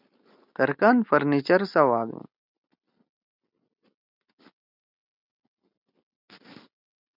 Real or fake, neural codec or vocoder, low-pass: real; none; 5.4 kHz